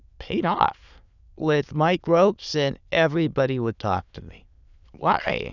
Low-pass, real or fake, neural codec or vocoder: 7.2 kHz; fake; autoencoder, 22.05 kHz, a latent of 192 numbers a frame, VITS, trained on many speakers